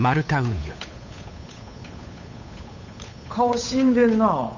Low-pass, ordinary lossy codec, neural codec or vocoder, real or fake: 7.2 kHz; none; codec, 16 kHz, 8 kbps, FunCodec, trained on Chinese and English, 25 frames a second; fake